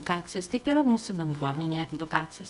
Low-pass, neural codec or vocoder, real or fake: 10.8 kHz; codec, 24 kHz, 0.9 kbps, WavTokenizer, medium music audio release; fake